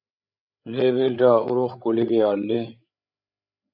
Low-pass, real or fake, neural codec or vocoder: 5.4 kHz; fake; codec, 16 kHz, 8 kbps, FreqCodec, larger model